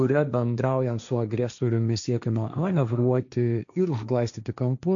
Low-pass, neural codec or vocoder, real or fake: 7.2 kHz; codec, 16 kHz, 1.1 kbps, Voila-Tokenizer; fake